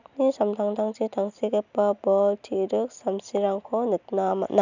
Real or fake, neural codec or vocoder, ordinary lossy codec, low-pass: real; none; none; 7.2 kHz